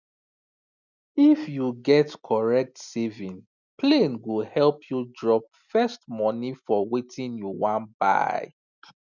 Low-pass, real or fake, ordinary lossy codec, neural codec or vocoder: 7.2 kHz; real; none; none